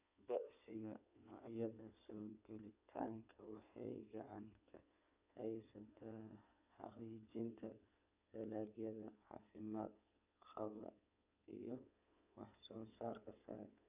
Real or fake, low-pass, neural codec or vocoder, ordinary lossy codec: fake; 3.6 kHz; codec, 16 kHz in and 24 kHz out, 2.2 kbps, FireRedTTS-2 codec; none